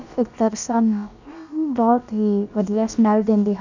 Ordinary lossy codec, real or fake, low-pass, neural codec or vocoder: none; fake; 7.2 kHz; codec, 16 kHz, about 1 kbps, DyCAST, with the encoder's durations